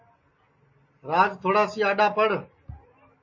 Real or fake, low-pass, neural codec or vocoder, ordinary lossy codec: real; 7.2 kHz; none; MP3, 32 kbps